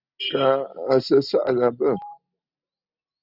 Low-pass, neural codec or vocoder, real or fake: 5.4 kHz; none; real